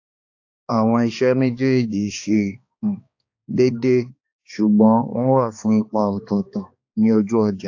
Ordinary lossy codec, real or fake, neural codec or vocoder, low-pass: AAC, 48 kbps; fake; codec, 16 kHz, 2 kbps, X-Codec, HuBERT features, trained on balanced general audio; 7.2 kHz